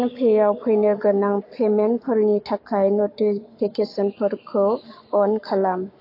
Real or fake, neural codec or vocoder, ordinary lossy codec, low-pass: fake; codec, 44.1 kHz, 7.8 kbps, Pupu-Codec; AAC, 32 kbps; 5.4 kHz